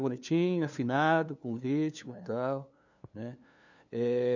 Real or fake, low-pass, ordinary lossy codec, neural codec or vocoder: fake; 7.2 kHz; none; codec, 16 kHz, 2 kbps, FunCodec, trained on LibriTTS, 25 frames a second